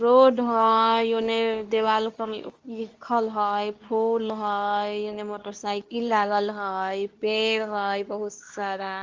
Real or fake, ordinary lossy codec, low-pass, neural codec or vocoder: fake; Opus, 32 kbps; 7.2 kHz; codec, 24 kHz, 0.9 kbps, WavTokenizer, medium speech release version 2